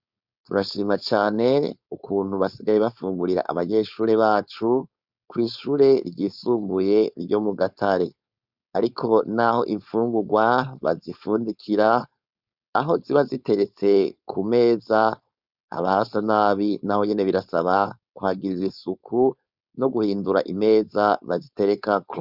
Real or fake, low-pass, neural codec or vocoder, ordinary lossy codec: fake; 5.4 kHz; codec, 16 kHz, 4.8 kbps, FACodec; Opus, 64 kbps